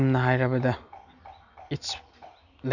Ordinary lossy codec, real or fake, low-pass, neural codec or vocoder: none; real; 7.2 kHz; none